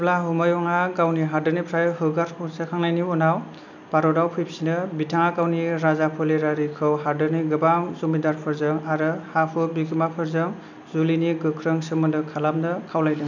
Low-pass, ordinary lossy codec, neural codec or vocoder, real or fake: 7.2 kHz; none; none; real